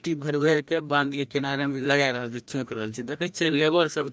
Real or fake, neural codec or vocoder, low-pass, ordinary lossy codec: fake; codec, 16 kHz, 1 kbps, FreqCodec, larger model; none; none